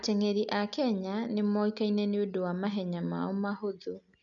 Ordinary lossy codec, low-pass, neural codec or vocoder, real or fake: none; 7.2 kHz; none; real